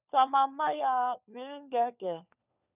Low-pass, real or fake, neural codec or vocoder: 3.6 kHz; fake; codec, 16 kHz, 4 kbps, FunCodec, trained on LibriTTS, 50 frames a second